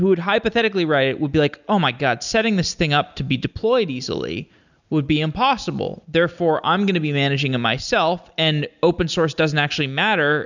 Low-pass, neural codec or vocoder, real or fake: 7.2 kHz; none; real